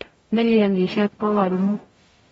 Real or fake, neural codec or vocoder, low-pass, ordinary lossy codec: fake; codec, 44.1 kHz, 0.9 kbps, DAC; 19.8 kHz; AAC, 24 kbps